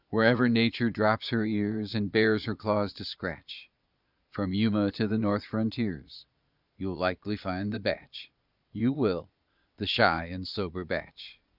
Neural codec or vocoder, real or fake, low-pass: vocoder, 22.05 kHz, 80 mel bands, Vocos; fake; 5.4 kHz